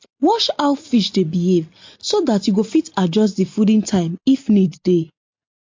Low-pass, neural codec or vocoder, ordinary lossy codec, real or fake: 7.2 kHz; none; MP3, 48 kbps; real